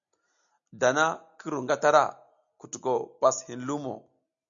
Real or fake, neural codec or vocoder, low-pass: real; none; 7.2 kHz